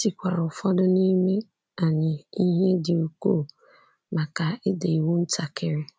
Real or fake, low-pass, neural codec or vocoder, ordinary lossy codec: real; none; none; none